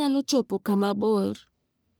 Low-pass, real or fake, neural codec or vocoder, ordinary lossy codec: none; fake; codec, 44.1 kHz, 1.7 kbps, Pupu-Codec; none